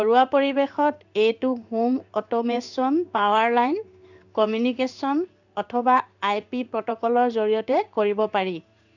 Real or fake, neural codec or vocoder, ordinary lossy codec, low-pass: fake; codec, 16 kHz in and 24 kHz out, 1 kbps, XY-Tokenizer; none; 7.2 kHz